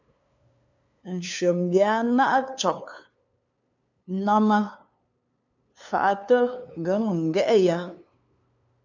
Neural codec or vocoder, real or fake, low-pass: codec, 16 kHz, 2 kbps, FunCodec, trained on LibriTTS, 25 frames a second; fake; 7.2 kHz